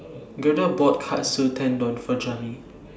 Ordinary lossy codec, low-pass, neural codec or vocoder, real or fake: none; none; none; real